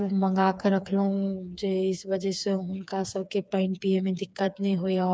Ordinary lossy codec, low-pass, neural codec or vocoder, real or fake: none; none; codec, 16 kHz, 4 kbps, FreqCodec, smaller model; fake